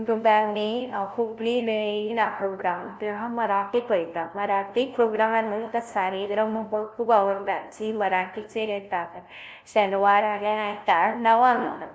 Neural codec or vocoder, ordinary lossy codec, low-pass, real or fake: codec, 16 kHz, 0.5 kbps, FunCodec, trained on LibriTTS, 25 frames a second; none; none; fake